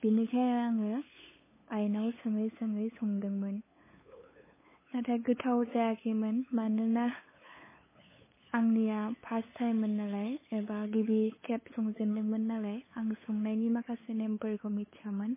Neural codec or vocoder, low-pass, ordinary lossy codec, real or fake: codec, 16 kHz, 8 kbps, FunCodec, trained on LibriTTS, 25 frames a second; 3.6 kHz; MP3, 16 kbps; fake